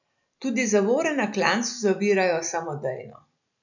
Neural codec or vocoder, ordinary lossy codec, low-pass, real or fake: none; none; 7.2 kHz; real